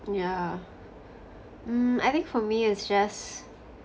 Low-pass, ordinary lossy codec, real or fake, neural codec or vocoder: none; none; real; none